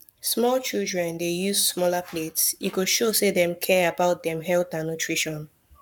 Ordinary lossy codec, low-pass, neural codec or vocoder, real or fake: none; 19.8 kHz; codec, 44.1 kHz, 7.8 kbps, Pupu-Codec; fake